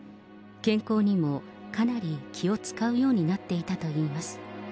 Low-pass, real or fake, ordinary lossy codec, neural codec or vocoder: none; real; none; none